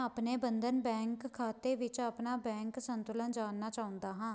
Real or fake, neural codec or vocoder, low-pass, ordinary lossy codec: real; none; none; none